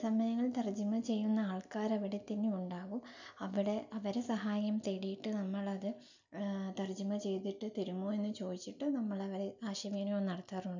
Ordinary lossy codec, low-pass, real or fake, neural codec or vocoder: none; 7.2 kHz; real; none